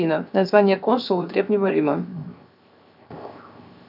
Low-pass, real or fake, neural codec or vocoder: 5.4 kHz; fake; codec, 16 kHz, 0.7 kbps, FocalCodec